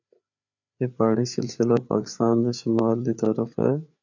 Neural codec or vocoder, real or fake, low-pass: codec, 16 kHz, 4 kbps, FreqCodec, larger model; fake; 7.2 kHz